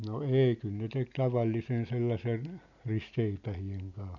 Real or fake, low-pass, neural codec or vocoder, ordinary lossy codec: real; 7.2 kHz; none; none